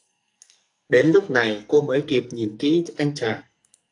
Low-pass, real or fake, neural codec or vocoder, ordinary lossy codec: 10.8 kHz; fake; codec, 44.1 kHz, 2.6 kbps, SNAC; AAC, 64 kbps